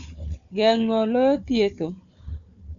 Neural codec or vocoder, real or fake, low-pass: codec, 16 kHz, 4 kbps, FunCodec, trained on Chinese and English, 50 frames a second; fake; 7.2 kHz